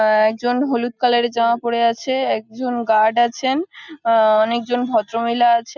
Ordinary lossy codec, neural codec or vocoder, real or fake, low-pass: none; none; real; 7.2 kHz